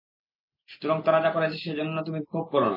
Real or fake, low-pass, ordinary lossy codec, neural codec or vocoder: real; 5.4 kHz; MP3, 24 kbps; none